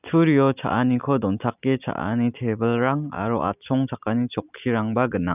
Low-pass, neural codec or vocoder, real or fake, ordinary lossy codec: 3.6 kHz; none; real; none